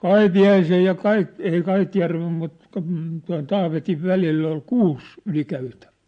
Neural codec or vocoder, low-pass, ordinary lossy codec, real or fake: none; 9.9 kHz; MP3, 48 kbps; real